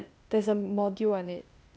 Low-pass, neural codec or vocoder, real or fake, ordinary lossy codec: none; codec, 16 kHz, 0.8 kbps, ZipCodec; fake; none